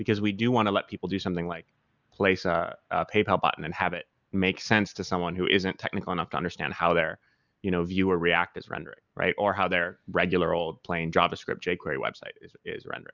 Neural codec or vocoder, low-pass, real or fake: none; 7.2 kHz; real